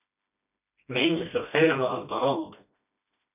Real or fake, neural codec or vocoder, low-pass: fake; codec, 16 kHz, 1 kbps, FreqCodec, smaller model; 3.6 kHz